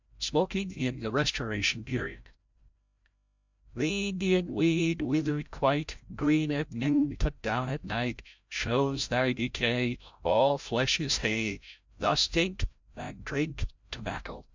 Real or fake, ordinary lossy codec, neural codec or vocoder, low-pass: fake; MP3, 64 kbps; codec, 16 kHz, 0.5 kbps, FreqCodec, larger model; 7.2 kHz